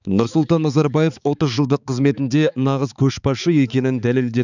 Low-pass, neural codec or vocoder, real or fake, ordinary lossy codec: 7.2 kHz; codec, 16 kHz, 4 kbps, X-Codec, HuBERT features, trained on balanced general audio; fake; none